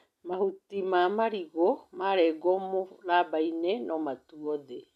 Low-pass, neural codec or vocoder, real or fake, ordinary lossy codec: 14.4 kHz; none; real; none